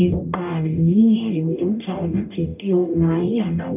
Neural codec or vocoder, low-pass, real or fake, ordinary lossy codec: codec, 44.1 kHz, 0.9 kbps, DAC; 3.6 kHz; fake; none